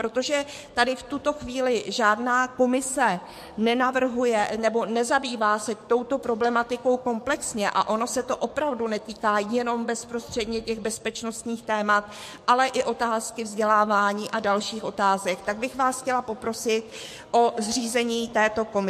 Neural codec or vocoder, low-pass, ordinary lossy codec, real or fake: codec, 44.1 kHz, 7.8 kbps, DAC; 14.4 kHz; MP3, 64 kbps; fake